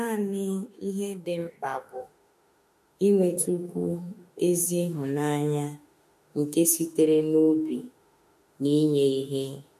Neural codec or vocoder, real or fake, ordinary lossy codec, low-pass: autoencoder, 48 kHz, 32 numbers a frame, DAC-VAE, trained on Japanese speech; fake; MP3, 64 kbps; 14.4 kHz